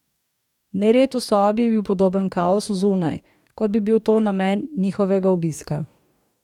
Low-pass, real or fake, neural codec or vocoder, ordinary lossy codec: 19.8 kHz; fake; codec, 44.1 kHz, 2.6 kbps, DAC; none